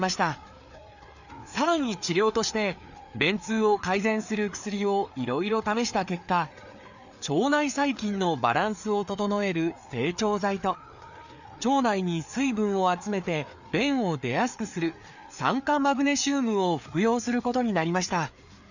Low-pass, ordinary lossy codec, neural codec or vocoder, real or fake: 7.2 kHz; none; codec, 16 kHz, 4 kbps, FreqCodec, larger model; fake